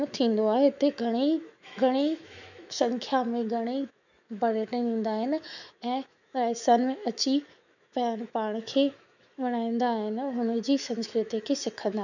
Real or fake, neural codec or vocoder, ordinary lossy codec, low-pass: fake; autoencoder, 48 kHz, 128 numbers a frame, DAC-VAE, trained on Japanese speech; none; 7.2 kHz